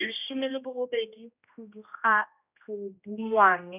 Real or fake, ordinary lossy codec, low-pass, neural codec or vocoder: fake; none; 3.6 kHz; codec, 16 kHz, 1 kbps, X-Codec, HuBERT features, trained on general audio